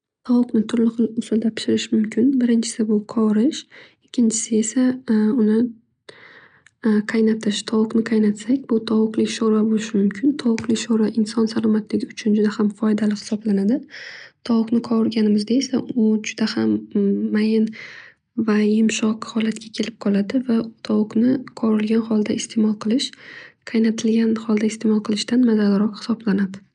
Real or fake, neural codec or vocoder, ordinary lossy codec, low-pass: real; none; none; 9.9 kHz